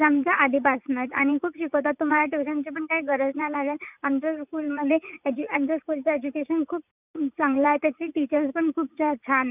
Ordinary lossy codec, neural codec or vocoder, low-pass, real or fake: none; vocoder, 44.1 kHz, 80 mel bands, Vocos; 3.6 kHz; fake